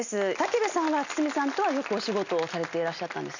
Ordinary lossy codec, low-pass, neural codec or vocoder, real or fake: none; 7.2 kHz; none; real